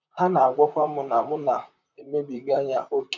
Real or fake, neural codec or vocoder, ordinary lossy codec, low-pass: fake; vocoder, 44.1 kHz, 128 mel bands, Pupu-Vocoder; none; 7.2 kHz